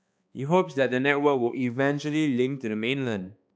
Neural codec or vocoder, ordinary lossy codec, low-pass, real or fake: codec, 16 kHz, 2 kbps, X-Codec, HuBERT features, trained on balanced general audio; none; none; fake